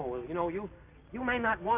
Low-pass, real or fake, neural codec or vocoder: 3.6 kHz; real; none